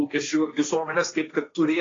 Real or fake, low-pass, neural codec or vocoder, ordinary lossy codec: fake; 7.2 kHz; codec, 16 kHz, 1.1 kbps, Voila-Tokenizer; AAC, 32 kbps